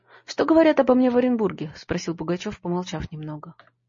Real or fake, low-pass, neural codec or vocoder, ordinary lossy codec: real; 7.2 kHz; none; MP3, 32 kbps